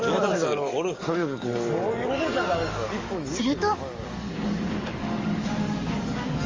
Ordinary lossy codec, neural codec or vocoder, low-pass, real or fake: Opus, 32 kbps; codec, 44.1 kHz, 7.8 kbps, DAC; 7.2 kHz; fake